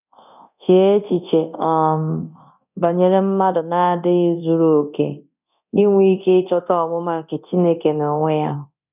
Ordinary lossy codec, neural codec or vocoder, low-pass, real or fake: none; codec, 24 kHz, 0.9 kbps, DualCodec; 3.6 kHz; fake